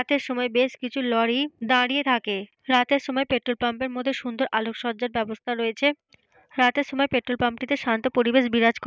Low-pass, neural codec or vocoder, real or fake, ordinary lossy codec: none; none; real; none